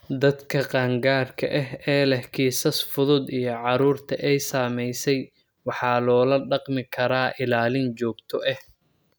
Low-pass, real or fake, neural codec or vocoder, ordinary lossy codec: none; real; none; none